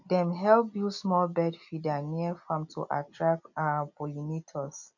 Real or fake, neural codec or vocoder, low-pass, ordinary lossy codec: real; none; 7.2 kHz; none